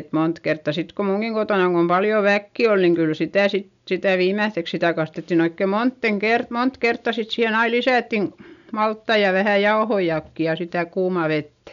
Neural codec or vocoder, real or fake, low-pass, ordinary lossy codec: none; real; 7.2 kHz; none